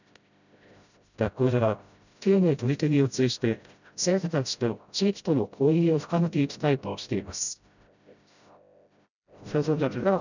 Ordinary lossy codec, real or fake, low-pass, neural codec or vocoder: none; fake; 7.2 kHz; codec, 16 kHz, 0.5 kbps, FreqCodec, smaller model